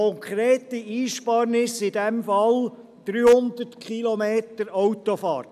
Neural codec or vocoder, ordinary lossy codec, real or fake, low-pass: none; none; real; 14.4 kHz